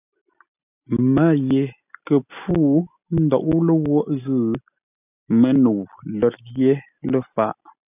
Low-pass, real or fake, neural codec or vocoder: 3.6 kHz; real; none